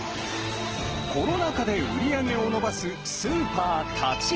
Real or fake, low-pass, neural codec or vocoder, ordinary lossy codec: real; 7.2 kHz; none; Opus, 16 kbps